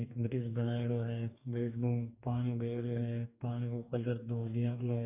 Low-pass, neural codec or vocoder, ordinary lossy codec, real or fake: 3.6 kHz; codec, 44.1 kHz, 2.6 kbps, DAC; none; fake